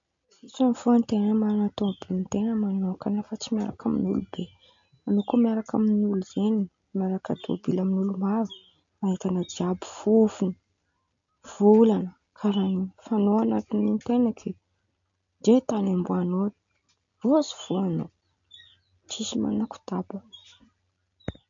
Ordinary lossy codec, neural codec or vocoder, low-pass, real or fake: MP3, 48 kbps; none; 7.2 kHz; real